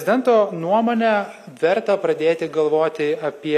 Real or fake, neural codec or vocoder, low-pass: real; none; 14.4 kHz